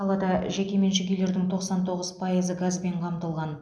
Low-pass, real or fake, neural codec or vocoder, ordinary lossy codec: none; real; none; none